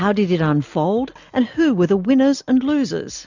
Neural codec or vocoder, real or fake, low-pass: none; real; 7.2 kHz